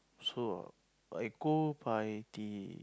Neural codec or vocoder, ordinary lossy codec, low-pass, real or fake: none; none; none; real